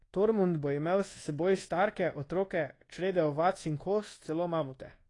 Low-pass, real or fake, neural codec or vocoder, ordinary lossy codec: 10.8 kHz; fake; codec, 24 kHz, 1.2 kbps, DualCodec; AAC, 32 kbps